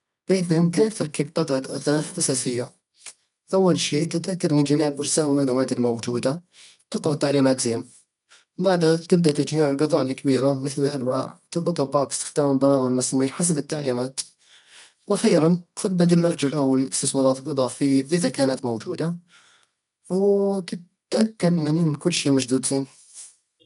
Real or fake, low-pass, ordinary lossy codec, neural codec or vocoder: fake; 10.8 kHz; none; codec, 24 kHz, 0.9 kbps, WavTokenizer, medium music audio release